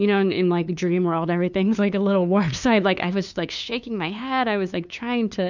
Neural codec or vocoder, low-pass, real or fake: codec, 16 kHz, 2 kbps, FunCodec, trained on LibriTTS, 25 frames a second; 7.2 kHz; fake